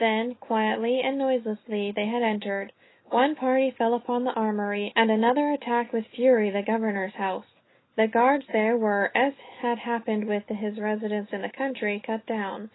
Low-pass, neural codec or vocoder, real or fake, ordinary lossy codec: 7.2 kHz; none; real; AAC, 16 kbps